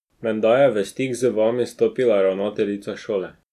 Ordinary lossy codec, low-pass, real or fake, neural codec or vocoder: none; 14.4 kHz; real; none